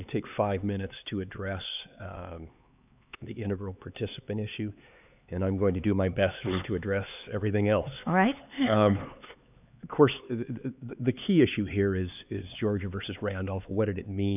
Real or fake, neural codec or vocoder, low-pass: fake; codec, 16 kHz, 4 kbps, X-Codec, HuBERT features, trained on LibriSpeech; 3.6 kHz